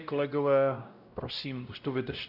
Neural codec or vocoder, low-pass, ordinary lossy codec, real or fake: codec, 16 kHz, 0.5 kbps, X-Codec, WavLM features, trained on Multilingual LibriSpeech; 5.4 kHz; Opus, 64 kbps; fake